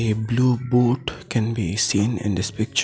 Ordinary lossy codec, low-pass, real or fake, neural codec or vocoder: none; none; real; none